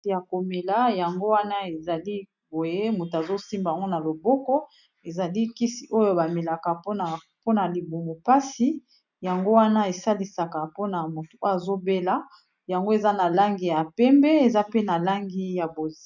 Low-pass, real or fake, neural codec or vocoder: 7.2 kHz; real; none